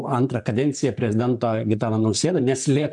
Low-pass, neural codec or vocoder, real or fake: 10.8 kHz; vocoder, 44.1 kHz, 128 mel bands, Pupu-Vocoder; fake